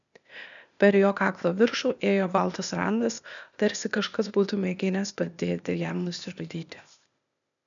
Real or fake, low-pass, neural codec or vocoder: fake; 7.2 kHz; codec, 16 kHz, 0.8 kbps, ZipCodec